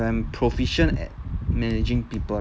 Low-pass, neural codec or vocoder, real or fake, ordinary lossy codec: none; none; real; none